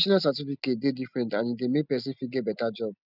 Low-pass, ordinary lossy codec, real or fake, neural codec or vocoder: 5.4 kHz; none; real; none